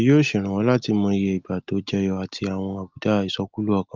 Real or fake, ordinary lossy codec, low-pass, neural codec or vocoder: real; Opus, 32 kbps; 7.2 kHz; none